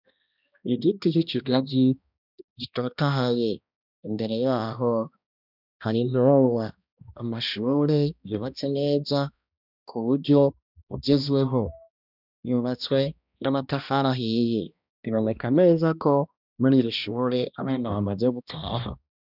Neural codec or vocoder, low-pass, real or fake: codec, 16 kHz, 1 kbps, X-Codec, HuBERT features, trained on balanced general audio; 5.4 kHz; fake